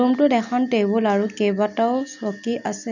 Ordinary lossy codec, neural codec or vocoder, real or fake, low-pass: none; none; real; 7.2 kHz